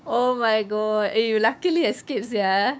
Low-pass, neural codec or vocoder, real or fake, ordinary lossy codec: none; codec, 16 kHz, 6 kbps, DAC; fake; none